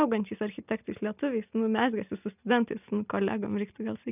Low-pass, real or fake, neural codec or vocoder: 3.6 kHz; real; none